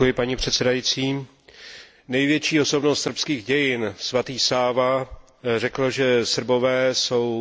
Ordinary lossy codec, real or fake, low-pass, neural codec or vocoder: none; real; none; none